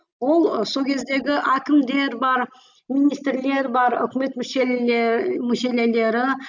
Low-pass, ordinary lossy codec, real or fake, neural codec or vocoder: 7.2 kHz; none; real; none